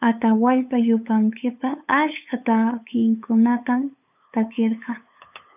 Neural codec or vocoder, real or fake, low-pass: codec, 16 kHz, 8 kbps, FunCodec, trained on LibriTTS, 25 frames a second; fake; 3.6 kHz